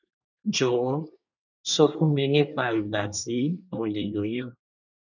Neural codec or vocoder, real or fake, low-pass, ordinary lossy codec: codec, 24 kHz, 1 kbps, SNAC; fake; 7.2 kHz; none